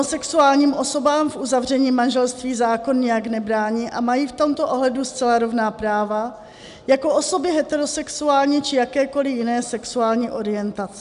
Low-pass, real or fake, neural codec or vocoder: 10.8 kHz; real; none